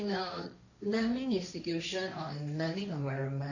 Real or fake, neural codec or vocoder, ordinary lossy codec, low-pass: fake; codec, 16 kHz, 1.1 kbps, Voila-Tokenizer; none; 7.2 kHz